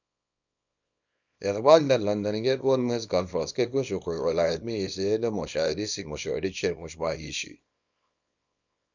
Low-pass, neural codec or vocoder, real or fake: 7.2 kHz; codec, 24 kHz, 0.9 kbps, WavTokenizer, small release; fake